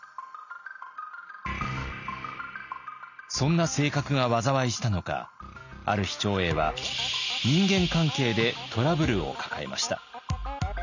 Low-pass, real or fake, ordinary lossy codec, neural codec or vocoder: 7.2 kHz; real; none; none